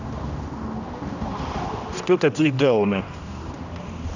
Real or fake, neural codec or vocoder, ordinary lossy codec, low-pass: fake; codec, 16 kHz, 1 kbps, X-Codec, HuBERT features, trained on general audio; none; 7.2 kHz